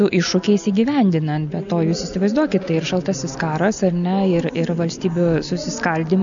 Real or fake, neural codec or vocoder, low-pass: real; none; 7.2 kHz